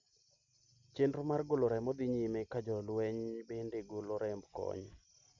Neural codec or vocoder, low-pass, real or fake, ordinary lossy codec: none; 7.2 kHz; real; none